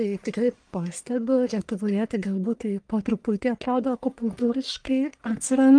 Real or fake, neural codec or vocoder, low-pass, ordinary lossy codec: fake; codec, 44.1 kHz, 1.7 kbps, Pupu-Codec; 9.9 kHz; Opus, 32 kbps